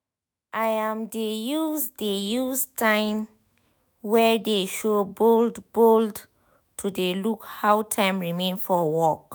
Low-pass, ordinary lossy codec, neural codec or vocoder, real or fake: none; none; autoencoder, 48 kHz, 128 numbers a frame, DAC-VAE, trained on Japanese speech; fake